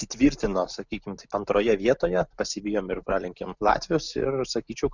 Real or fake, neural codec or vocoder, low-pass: real; none; 7.2 kHz